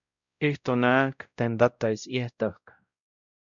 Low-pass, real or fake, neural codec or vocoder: 7.2 kHz; fake; codec, 16 kHz, 0.5 kbps, X-Codec, WavLM features, trained on Multilingual LibriSpeech